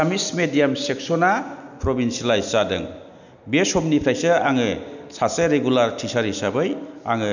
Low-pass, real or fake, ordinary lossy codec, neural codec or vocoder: 7.2 kHz; real; none; none